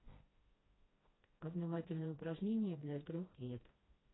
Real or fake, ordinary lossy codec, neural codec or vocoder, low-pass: fake; AAC, 16 kbps; codec, 16 kHz, 1 kbps, FreqCodec, smaller model; 7.2 kHz